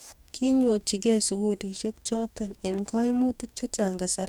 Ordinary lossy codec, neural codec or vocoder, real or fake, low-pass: none; codec, 44.1 kHz, 2.6 kbps, DAC; fake; 19.8 kHz